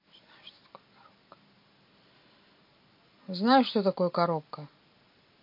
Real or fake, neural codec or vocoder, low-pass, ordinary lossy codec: real; none; 5.4 kHz; MP3, 32 kbps